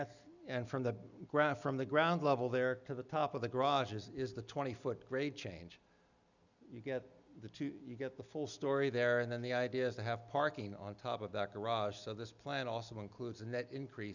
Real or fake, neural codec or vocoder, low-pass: real; none; 7.2 kHz